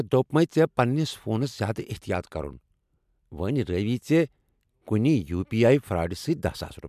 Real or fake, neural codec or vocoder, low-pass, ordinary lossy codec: real; none; 14.4 kHz; MP3, 96 kbps